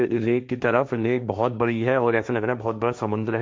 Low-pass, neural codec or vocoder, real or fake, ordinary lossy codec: none; codec, 16 kHz, 1.1 kbps, Voila-Tokenizer; fake; none